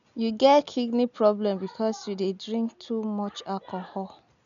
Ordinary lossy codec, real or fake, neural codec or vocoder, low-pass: none; real; none; 7.2 kHz